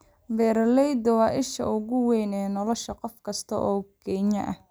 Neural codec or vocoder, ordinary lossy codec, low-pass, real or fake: none; none; none; real